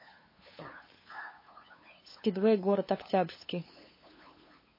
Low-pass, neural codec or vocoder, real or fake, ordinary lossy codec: 5.4 kHz; codec, 16 kHz, 8 kbps, FunCodec, trained on LibriTTS, 25 frames a second; fake; MP3, 24 kbps